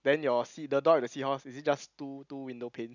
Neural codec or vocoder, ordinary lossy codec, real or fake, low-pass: none; none; real; 7.2 kHz